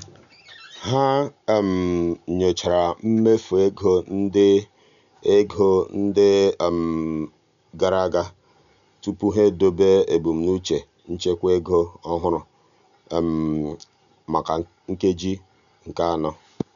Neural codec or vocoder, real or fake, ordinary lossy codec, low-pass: none; real; none; 7.2 kHz